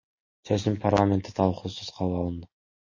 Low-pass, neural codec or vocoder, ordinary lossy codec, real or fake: 7.2 kHz; none; MP3, 32 kbps; real